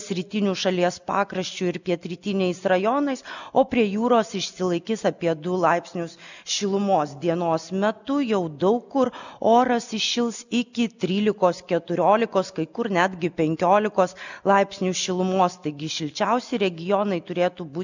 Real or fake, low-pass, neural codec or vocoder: real; 7.2 kHz; none